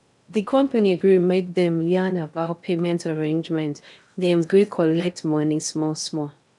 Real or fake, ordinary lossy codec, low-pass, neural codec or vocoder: fake; none; 10.8 kHz; codec, 16 kHz in and 24 kHz out, 0.6 kbps, FocalCodec, streaming, 2048 codes